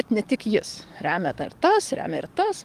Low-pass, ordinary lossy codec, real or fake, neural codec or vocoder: 14.4 kHz; Opus, 24 kbps; fake; codec, 44.1 kHz, 7.8 kbps, DAC